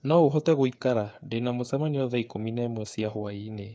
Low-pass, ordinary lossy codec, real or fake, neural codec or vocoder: none; none; fake; codec, 16 kHz, 8 kbps, FreqCodec, smaller model